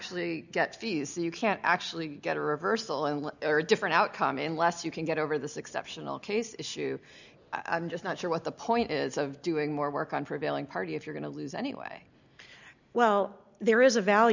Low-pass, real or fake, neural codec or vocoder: 7.2 kHz; real; none